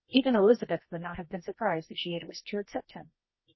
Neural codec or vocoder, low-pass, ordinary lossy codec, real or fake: codec, 24 kHz, 0.9 kbps, WavTokenizer, medium music audio release; 7.2 kHz; MP3, 24 kbps; fake